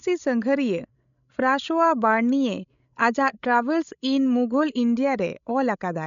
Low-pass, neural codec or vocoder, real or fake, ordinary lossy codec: 7.2 kHz; codec, 16 kHz, 16 kbps, FreqCodec, larger model; fake; none